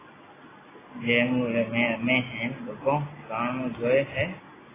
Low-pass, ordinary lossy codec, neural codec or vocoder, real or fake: 3.6 kHz; AAC, 16 kbps; none; real